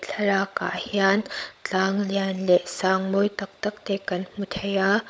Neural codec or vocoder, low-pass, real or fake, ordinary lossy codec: codec, 16 kHz, 16 kbps, FunCodec, trained on LibriTTS, 50 frames a second; none; fake; none